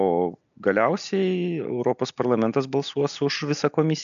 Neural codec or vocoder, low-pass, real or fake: none; 7.2 kHz; real